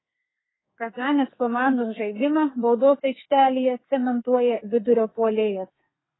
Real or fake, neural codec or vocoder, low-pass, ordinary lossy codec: fake; codec, 32 kHz, 1.9 kbps, SNAC; 7.2 kHz; AAC, 16 kbps